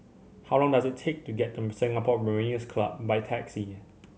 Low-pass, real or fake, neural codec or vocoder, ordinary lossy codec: none; real; none; none